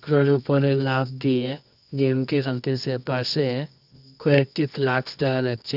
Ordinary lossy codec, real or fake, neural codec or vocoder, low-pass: none; fake; codec, 24 kHz, 0.9 kbps, WavTokenizer, medium music audio release; 5.4 kHz